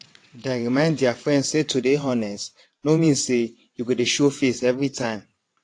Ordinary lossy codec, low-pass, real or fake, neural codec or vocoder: AAC, 48 kbps; 9.9 kHz; fake; vocoder, 22.05 kHz, 80 mel bands, WaveNeXt